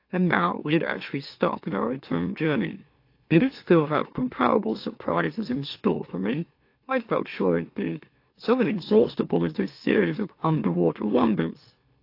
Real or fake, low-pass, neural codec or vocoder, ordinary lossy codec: fake; 5.4 kHz; autoencoder, 44.1 kHz, a latent of 192 numbers a frame, MeloTTS; AAC, 32 kbps